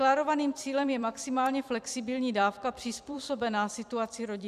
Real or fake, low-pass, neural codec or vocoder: fake; 14.4 kHz; vocoder, 44.1 kHz, 128 mel bands every 256 samples, BigVGAN v2